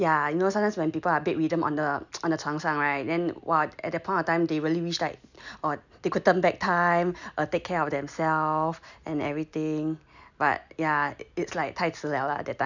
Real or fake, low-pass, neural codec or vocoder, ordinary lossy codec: real; 7.2 kHz; none; none